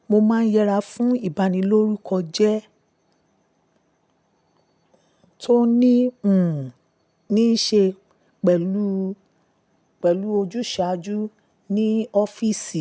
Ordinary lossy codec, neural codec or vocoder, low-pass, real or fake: none; none; none; real